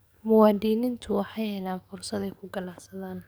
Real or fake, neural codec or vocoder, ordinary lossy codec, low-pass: fake; codec, 44.1 kHz, 7.8 kbps, DAC; none; none